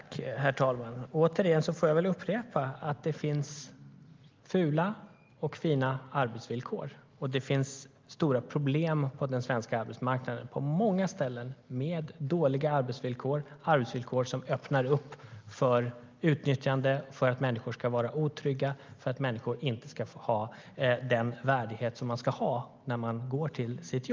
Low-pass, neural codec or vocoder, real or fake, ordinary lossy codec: 7.2 kHz; none; real; Opus, 32 kbps